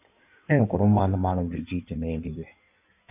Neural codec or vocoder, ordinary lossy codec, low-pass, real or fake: codec, 16 kHz in and 24 kHz out, 1.1 kbps, FireRedTTS-2 codec; none; 3.6 kHz; fake